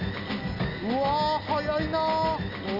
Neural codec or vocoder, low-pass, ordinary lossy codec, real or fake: none; 5.4 kHz; none; real